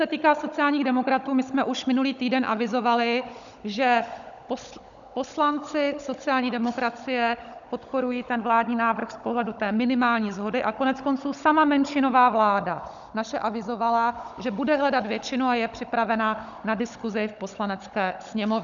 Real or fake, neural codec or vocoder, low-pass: fake; codec, 16 kHz, 16 kbps, FunCodec, trained on Chinese and English, 50 frames a second; 7.2 kHz